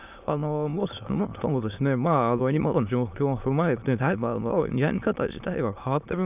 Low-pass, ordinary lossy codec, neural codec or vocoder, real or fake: 3.6 kHz; none; autoencoder, 22.05 kHz, a latent of 192 numbers a frame, VITS, trained on many speakers; fake